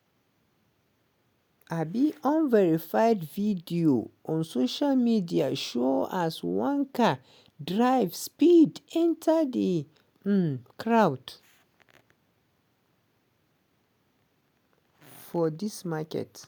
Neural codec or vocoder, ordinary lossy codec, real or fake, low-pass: none; none; real; none